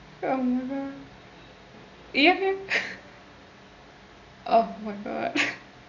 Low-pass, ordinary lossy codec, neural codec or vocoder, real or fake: 7.2 kHz; none; none; real